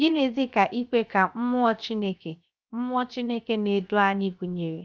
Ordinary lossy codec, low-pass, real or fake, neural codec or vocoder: none; none; fake; codec, 16 kHz, 0.7 kbps, FocalCodec